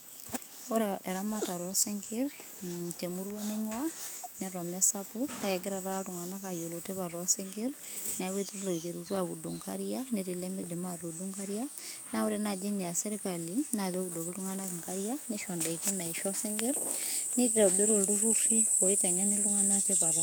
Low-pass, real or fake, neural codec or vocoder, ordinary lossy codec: none; fake; codec, 44.1 kHz, 7.8 kbps, DAC; none